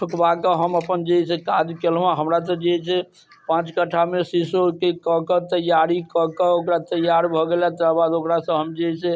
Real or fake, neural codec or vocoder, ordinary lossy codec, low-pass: real; none; none; none